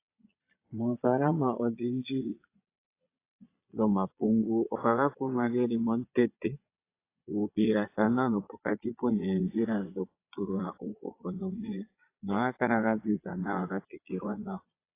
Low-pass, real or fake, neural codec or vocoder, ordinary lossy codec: 3.6 kHz; fake; vocoder, 22.05 kHz, 80 mel bands, Vocos; AAC, 24 kbps